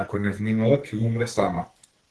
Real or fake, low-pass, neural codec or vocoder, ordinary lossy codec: fake; 10.8 kHz; codec, 44.1 kHz, 2.6 kbps, SNAC; Opus, 16 kbps